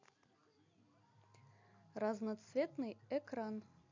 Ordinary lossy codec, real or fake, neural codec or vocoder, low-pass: MP3, 48 kbps; real; none; 7.2 kHz